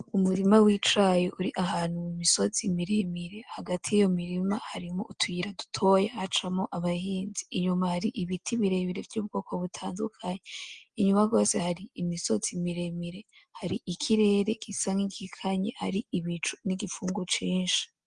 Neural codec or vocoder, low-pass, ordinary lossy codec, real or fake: none; 9.9 kHz; Opus, 24 kbps; real